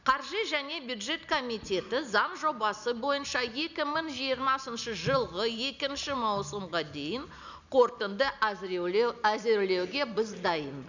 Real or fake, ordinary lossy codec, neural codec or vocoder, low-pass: real; none; none; 7.2 kHz